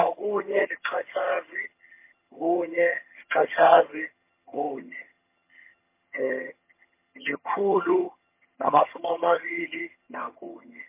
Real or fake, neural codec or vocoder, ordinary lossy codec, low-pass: fake; vocoder, 22.05 kHz, 80 mel bands, HiFi-GAN; MP3, 24 kbps; 3.6 kHz